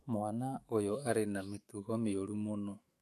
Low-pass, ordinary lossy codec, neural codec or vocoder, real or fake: 14.4 kHz; AAC, 64 kbps; autoencoder, 48 kHz, 128 numbers a frame, DAC-VAE, trained on Japanese speech; fake